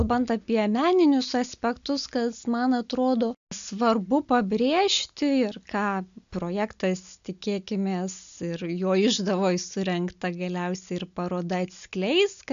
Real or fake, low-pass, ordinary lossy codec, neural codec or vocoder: real; 7.2 kHz; AAC, 96 kbps; none